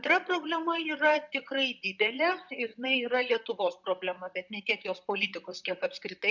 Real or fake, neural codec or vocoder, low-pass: fake; vocoder, 44.1 kHz, 128 mel bands, Pupu-Vocoder; 7.2 kHz